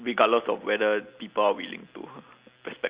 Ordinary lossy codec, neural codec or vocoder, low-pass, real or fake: Opus, 64 kbps; none; 3.6 kHz; real